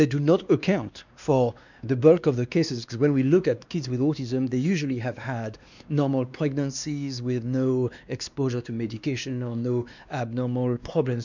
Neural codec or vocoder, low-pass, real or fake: codec, 16 kHz, 2 kbps, X-Codec, WavLM features, trained on Multilingual LibriSpeech; 7.2 kHz; fake